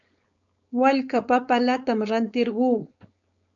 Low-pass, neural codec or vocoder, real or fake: 7.2 kHz; codec, 16 kHz, 4.8 kbps, FACodec; fake